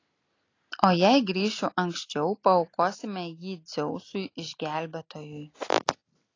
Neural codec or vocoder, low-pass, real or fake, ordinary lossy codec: none; 7.2 kHz; real; AAC, 32 kbps